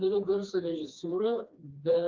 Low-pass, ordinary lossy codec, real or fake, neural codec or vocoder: 7.2 kHz; Opus, 24 kbps; fake; codec, 16 kHz, 2 kbps, FreqCodec, smaller model